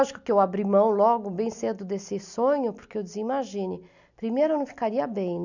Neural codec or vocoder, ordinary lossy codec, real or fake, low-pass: none; none; real; 7.2 kHz